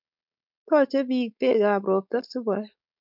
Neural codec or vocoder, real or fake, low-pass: codec, 16 kHz, 4.8 kbps, FACodec; fake; 5.4 kHz